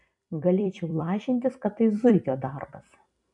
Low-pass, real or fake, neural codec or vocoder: 10.8 kHz; fake; vocoder, 44.1 kHz, 128 mel bands every 256 samples, BigVGAN v2